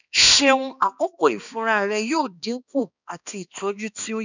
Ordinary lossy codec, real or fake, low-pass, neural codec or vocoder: none; fake; 7.2 kHz; codec, 16 kHz, 2 kbps, X-Codec, HuBERT features, trained on balanced general audio